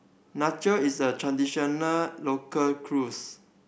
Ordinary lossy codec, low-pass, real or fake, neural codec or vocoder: none; none; real; none